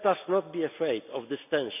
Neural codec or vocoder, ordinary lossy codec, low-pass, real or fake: none; none; 3.6 kHz; real